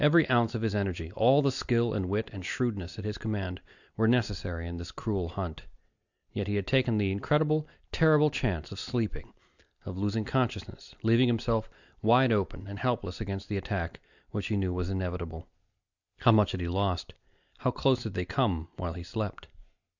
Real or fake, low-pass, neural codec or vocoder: real; 7.2 kHz; none